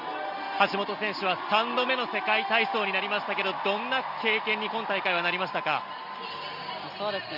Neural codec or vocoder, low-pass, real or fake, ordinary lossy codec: none; 5.4 kHz; real; none